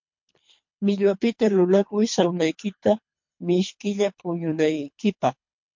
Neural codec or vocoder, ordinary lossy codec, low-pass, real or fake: codec, 24 kHz, 3 kbps, HILCodec; MP3, 48 kbps; 7.2 kHz; fake